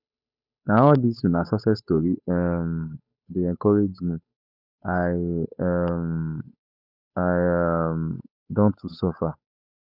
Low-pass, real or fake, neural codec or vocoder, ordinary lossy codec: 5.4 kHz; fake; codec, 16 kHz, 8 kbps, FunCodec, trained on Chinese and English, 25 frames a second; none